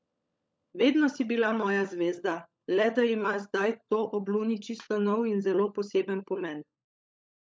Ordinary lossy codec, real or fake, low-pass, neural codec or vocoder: none; fake; none; codec, 16 kHz, 16 kbps, FunCodec, trained on LibriTTS, 50 frames a second